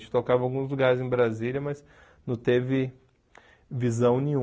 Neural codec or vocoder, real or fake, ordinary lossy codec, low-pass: none; real; none; none